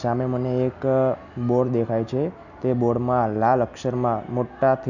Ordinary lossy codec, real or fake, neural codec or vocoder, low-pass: none; real; none; 7.2 kHz